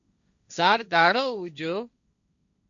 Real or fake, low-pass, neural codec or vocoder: fake; 7.2 kHz; codec, 16 kHz, 1.1 kbps, Voila-Tokenizer